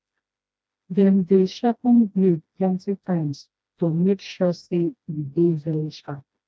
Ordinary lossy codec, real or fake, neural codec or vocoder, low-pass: none; fake; codec, 16 kHz, 1 kbps, FreqCodec, smaller model; none